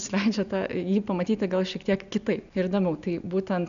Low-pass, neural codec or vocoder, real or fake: 7.2 kHz; none; real